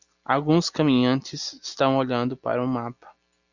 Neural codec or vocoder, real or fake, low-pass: none; real; 7.2 kHz